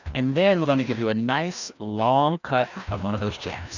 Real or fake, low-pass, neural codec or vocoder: fake; 7.2 kHz; codec, 16 kHz, 1 kbps, FreqCodec, larger model